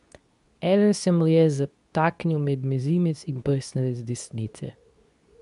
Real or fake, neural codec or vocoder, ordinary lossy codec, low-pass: fake; codec, 24 kHz, 0.9 kbps, WavTokenizer, medium speech release version 2; none; 10.8 kHz